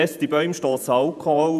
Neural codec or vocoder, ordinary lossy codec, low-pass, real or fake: vocoder, 48 kHz, 128 mel bands, Vocos; none; 14.4 kHz; fake